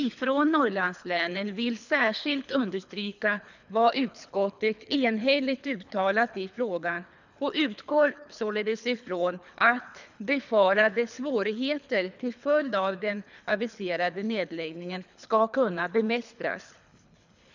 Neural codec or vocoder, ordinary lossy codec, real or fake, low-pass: codec, 24 kHz, 3 kbps, HILCodec; none; fake; 7.2 kHz